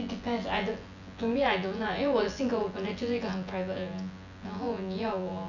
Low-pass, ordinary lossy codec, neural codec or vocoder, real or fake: 7.2 kHz; none; vocoder, 24 kHz, 100 mel bands, Vocos; fake